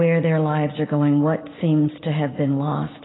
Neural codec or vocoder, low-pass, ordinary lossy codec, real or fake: none; 7.2 kHz; AAC, 16 kbps; real